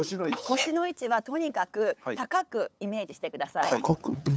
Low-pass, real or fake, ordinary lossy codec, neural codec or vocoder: none; fake; none; codec, 16 kHz, 8 kbps, FunCodec, trained on LibriTTS, 25 frames a second